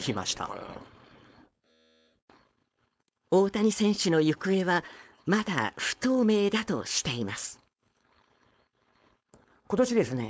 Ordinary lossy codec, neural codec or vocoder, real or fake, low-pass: none; codec, 16 kHz, 4.8 kbps, FACodec; fake; none